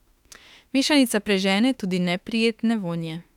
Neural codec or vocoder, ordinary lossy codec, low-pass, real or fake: autoencoder, 48 kHz, 32 numbers a frame, DAC-VAE, trained on Japanese speech; none; 19.8 kHz; fake